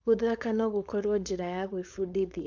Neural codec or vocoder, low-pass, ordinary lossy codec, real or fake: codec, 16 kHz, 4.8 kbps, FACodec; 7.2 kHz; MP3, 64 kbps; fake